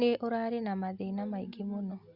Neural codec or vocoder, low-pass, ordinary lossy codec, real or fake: none; 5.4 kHz; Opus, 64 kbps; real